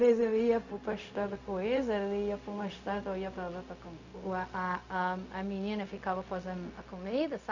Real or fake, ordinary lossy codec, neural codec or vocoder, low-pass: fake; none; codec, 16 kHz, 0.4 kbps, LongCat-Audio-Codec; 7.2 kHz